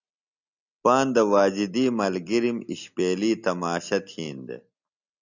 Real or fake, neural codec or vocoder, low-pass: real; none; 7.2 kHz